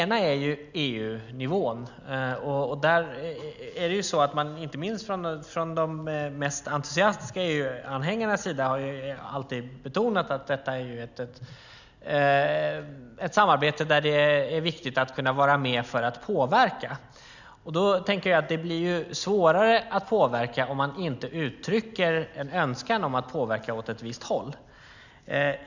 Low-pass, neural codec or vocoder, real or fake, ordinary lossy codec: 7.2 kHz; none; real; none